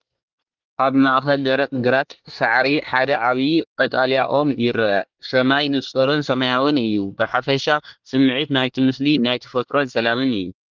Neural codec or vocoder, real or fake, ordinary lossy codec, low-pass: codec, 24 kHz, 1 kbps, SNAC; fake; Opus, 32 kbps; 7.2 kHz